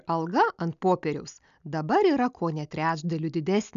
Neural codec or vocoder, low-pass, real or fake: none; 7.2 kHz; real